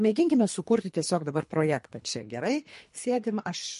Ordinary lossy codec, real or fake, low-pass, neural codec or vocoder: MP3, 48 kbps; fake; 10.8 kHz; codec, 24 kHz, 3 kbps, HILCodec